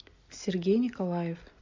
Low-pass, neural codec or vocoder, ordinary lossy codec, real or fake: 7.2 kHz; none; MP3, 64 kbps; real